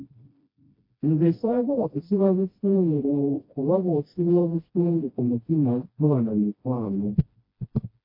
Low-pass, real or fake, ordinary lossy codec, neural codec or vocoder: 5.4 kHz; fake; MP3, 32 kbps; codec, 16 kHz, 1 kbps, FreqCodec, smaller model